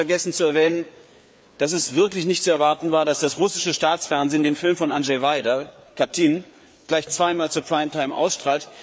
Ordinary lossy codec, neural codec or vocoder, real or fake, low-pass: none; codec, 16 kHz, 4 kbps, FreqCodec, larger model; fake; none